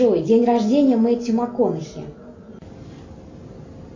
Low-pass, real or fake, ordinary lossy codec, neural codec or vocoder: 7.2 kHz; real; AAC, 48 kbps; none